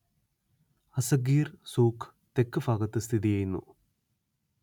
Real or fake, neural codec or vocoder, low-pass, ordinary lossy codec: real; none; 19.8 kHz; none